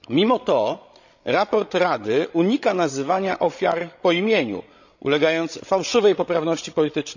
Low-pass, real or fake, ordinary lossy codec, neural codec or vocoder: 7.2 kHz; fake; none; codec, 16 kHz, 16 kbps, FreqCodec, larger model